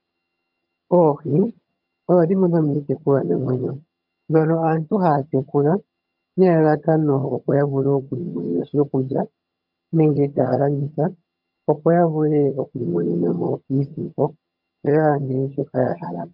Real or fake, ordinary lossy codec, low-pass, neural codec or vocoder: fake; MP3, 48 kbps; 5.4 kHz; vocoder, 22.05 kHz, 80 mel bands, HiFi-GAN